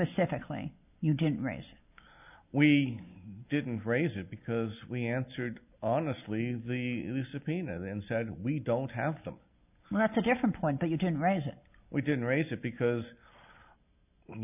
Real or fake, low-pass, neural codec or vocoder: real; 3.6 kHz; none